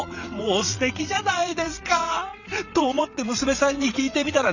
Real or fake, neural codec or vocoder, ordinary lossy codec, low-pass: fake; vocoder, 22.05 kHz, 80 mel bands, WaveNeXt; none; 7.2 kHz